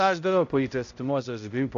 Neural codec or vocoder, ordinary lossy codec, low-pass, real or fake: codec, 16 kHz, 0.5 kbps, X-Codec, HuBERT features, trained on balanced general audio; AAC, 48 kbps; 7.2 kHz; fake